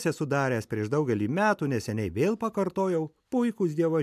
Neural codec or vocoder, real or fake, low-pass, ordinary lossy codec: none; real; 14.4 kHz; MP3, 96 kbps